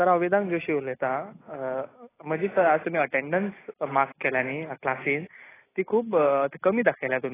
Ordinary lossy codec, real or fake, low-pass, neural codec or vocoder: AAC, 16 kbps; real; 3.6 kHz; none